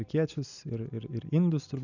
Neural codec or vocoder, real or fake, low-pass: none; real; 7.2 kHz